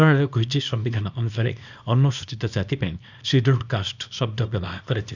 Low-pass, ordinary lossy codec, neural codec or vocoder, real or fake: 7.2 kHz; none; codec, 24 kHz, 0.9 kbps, WavTokenizer, small release; fake